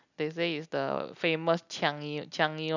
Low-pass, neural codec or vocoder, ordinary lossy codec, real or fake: 7.2 kHz; none; none; real